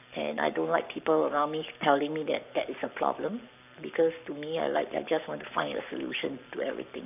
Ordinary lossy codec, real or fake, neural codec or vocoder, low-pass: none; fake; codec, 44.1 kHz, 7.8 kbps, Pupu-Codec; 3.6 kHz